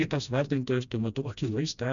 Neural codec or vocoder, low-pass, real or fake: codec, 16 kHz, 1 kbps, FreqCodec, smaller model; 7.2 kHz; fake